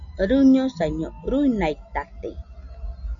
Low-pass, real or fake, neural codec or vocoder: 7.2 kHz; real; none